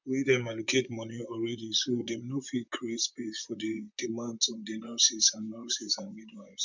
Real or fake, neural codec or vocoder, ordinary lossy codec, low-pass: fake; vocoder, 44.1 kHz, 128 mel bands, Pupu-Vocoder; MP3, 64 kbps; 7.2 kHz